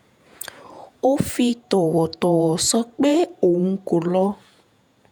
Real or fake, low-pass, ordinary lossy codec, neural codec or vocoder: fake; none; none; vocoder, 48 kHz, 128 mel bands, Vocos